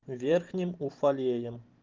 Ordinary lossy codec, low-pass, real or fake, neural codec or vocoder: Opus, 32 kbps; 7.2 kHz; fake; vocoder, 44.1 kHz, 128 mel bands every 512 samples, BigVGAN v2